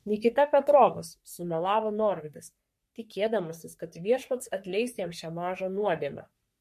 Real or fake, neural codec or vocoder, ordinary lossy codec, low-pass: fake; codec, 44.1 kHz, 3.4 kbps, Pupu-Codec; MP3, 64 kbps; 14.4 kHz